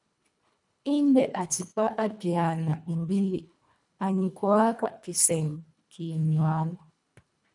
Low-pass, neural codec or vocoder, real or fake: 10.8 kHz; codec, 24 kHz, 1.5 kbps, HILCodec; fake